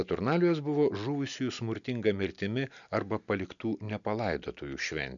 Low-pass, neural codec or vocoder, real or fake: 7.2 kHz; none; real